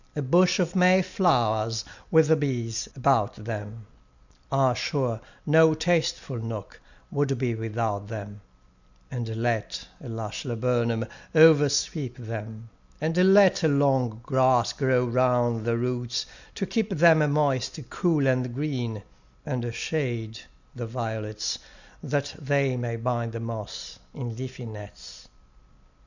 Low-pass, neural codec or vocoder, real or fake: 7.2 kHz; none; real